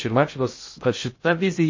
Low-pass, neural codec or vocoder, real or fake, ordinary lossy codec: 7.2 kHz; codec, 16 kHz in and 24 kHz out, 0.6 kbps, FocalCodec, streaming, 2048 codes; fake; MP3, 32 kbps